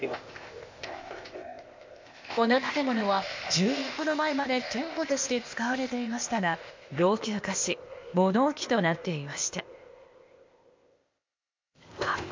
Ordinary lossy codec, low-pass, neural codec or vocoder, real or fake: MP3, 48 kbps; 7.2 kHz; codec, 16 kHz, 0.8 kbps, ZipCodec; fake